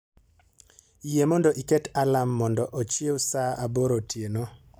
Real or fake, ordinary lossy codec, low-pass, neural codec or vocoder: real; none; none; none